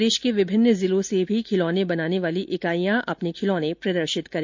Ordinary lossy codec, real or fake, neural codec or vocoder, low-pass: MP3, 48 kbps; real; none; 7.2 kHz